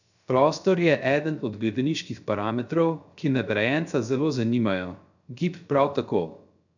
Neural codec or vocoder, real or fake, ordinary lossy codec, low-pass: codec, 16 kHz, 0.3 kbps, FocalCodec; fake; none; 7.2 kHz